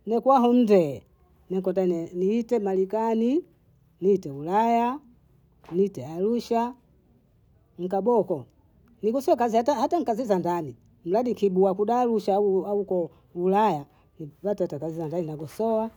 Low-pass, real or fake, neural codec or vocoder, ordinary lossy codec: none; real; none; none